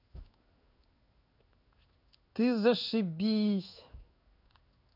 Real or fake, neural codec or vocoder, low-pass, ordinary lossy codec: fake; codec, 16 kHz in and 24 kHz out, 1 kbps, XY-Tokenizer; 5.4 kHz; none